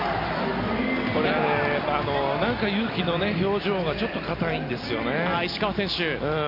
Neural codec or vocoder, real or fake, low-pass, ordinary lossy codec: none; real; 5.4 kHz; MP3, 32 kbps